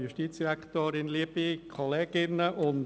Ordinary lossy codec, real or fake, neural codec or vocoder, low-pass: none; real; none; none